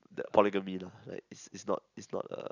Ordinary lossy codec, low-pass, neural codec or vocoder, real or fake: none; 7.2 kHz; none; real